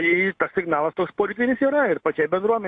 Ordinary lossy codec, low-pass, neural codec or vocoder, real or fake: AAC, 48 kbps; 9.9 kHz; none; real